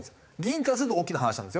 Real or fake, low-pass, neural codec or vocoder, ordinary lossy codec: fake; none; codec, 16 kHz, 4 kbps, X-Codec, HuBERT features, trained on balanced general audio; none